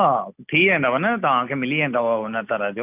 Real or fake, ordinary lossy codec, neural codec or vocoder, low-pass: real; none; none; 3.6 kHz